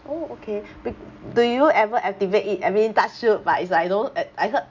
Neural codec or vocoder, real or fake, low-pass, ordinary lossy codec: none; real; 7.2 kHz; MP3, 64 kbps